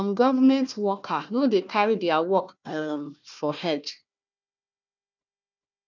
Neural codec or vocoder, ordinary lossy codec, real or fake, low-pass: codec, 16 kHz, 1 kbps, FunCodec, trained on Chinese and English, 50 frames a second; none; fake; 7.2 kHz